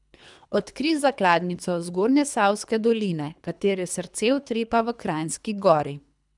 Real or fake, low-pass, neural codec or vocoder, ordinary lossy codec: fake; 10.8 kHz; codec, 24 kHz, 3 kbps, HILCodec; none